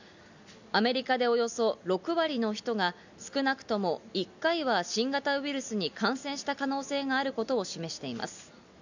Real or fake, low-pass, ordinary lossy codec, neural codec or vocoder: real; 7.2 kHz; none; none